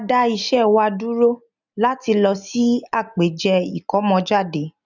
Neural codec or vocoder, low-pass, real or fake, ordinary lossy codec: none; 7.2 kHz; real; none